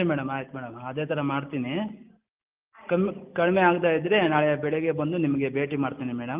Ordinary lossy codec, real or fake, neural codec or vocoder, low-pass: Opus, 32 kbps; real; none; 3.6 kHz